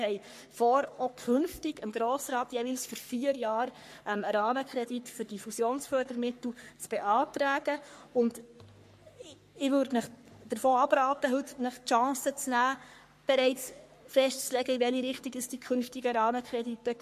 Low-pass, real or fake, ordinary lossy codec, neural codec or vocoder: 14.4 kHz; fake; MP3, 64 kbps; codec, 44.1 kHz, 3.4 kbps, Pupu-Codec